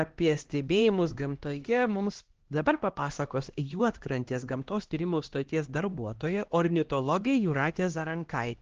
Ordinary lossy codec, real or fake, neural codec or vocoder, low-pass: Opus, 16 kbps; fake; codec, 16 kHz, 1 kbps, X-Codec, HuBERT features, trained on LibriSpeech; 7.2 kHz